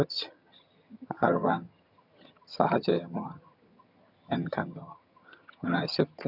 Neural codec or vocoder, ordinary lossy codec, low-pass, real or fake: vocoder, 22.05 kHz, 80 mel bands, HiFi-GAN; none; 5.4 kHz; fake